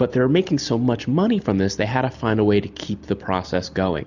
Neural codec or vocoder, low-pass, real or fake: none; 7.2 kHz; real